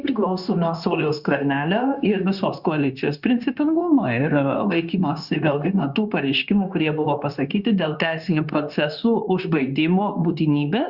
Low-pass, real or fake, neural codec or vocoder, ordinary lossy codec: 5.4 kHz; fake; codec, 16 kHz, 0.9 kbps, LongCat-Audio-Codec; Opus, 64 kbps